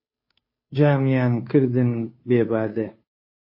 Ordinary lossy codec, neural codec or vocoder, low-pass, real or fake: MP3, 24 kbps; codec, 16 kHz, 2 kbps, FunCodec, trained on Chinese and English, 25 frames a second; 5.4 kHz; fake